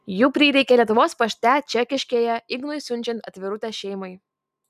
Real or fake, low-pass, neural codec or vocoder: real; 14.4 kHz; none